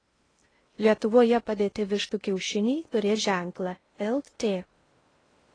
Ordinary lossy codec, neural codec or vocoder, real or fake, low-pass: AAC, 32 kbps; codec, 16 kHz in and 24 kHz out, 0.6 kbps, FocalCodec, streaming, 2048 codes; fake; 9.9 kHz